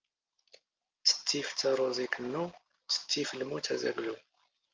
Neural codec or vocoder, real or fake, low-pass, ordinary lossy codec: none; real; 7.2 kHz; Opus, 24 kbps